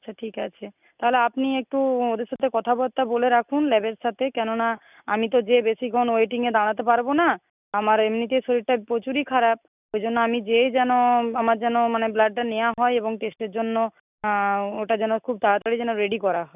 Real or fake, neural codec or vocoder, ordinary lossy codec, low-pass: real; none; none; 3.6 kHz